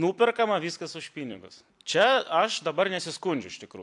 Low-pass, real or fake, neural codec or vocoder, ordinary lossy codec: 10.8 kHz; fake; vocoder, 44.1 kHz, 128 mel bands every 256 samples, BigVGAN v2; AAC, 64 kbps